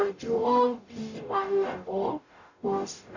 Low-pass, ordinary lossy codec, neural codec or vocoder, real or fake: 7.2 kHz; none; codec, 44.1 kHz, 0.9 kbps, DAC; fake